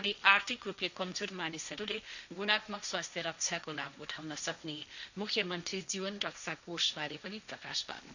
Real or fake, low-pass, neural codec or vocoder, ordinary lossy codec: fake; 7.2 kHz; codec, 16 kHz, 1.1 kbps, Voila-Tokenizer; none